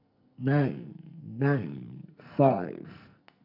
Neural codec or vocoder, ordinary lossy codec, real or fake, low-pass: codec, 44.1 kHz, 2.6 kbps, SNAC; none; fake; 5.4 kHz